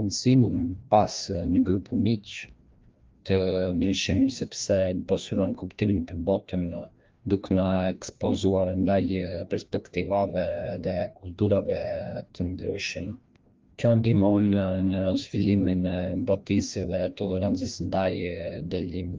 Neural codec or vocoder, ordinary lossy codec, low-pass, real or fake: codec, 16 kHz, 1 kbps, FreqCodec, larger model; Opus, 32 kbps; 7.2 kHz; fake